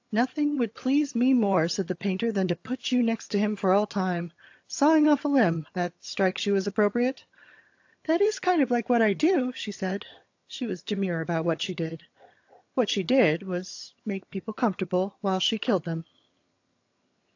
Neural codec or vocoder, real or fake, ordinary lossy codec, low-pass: vocoder, 22.05 kHz, 80 mel bands, HiFi-GAN; fake; AAC, 48 kbps; 7.2 kHz